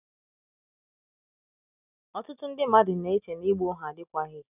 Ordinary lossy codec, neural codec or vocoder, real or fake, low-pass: none; none; real; 3.6 kHz